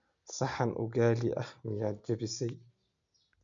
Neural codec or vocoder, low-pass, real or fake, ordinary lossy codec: none; 7.2 kHz; real; AAC, 64 kbps